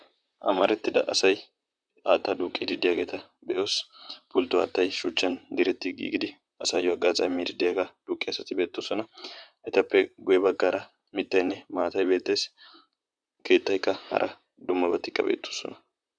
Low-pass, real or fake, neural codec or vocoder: 9.9 kHz; fake; vocoder, 44.1 kHz, 128 mel bands, Pupu-Vocoder